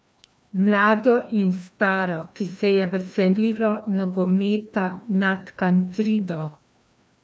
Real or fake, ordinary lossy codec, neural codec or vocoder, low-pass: fake; none; codec, 16 kHz, 1 kbps, FreqCodec, larger model; none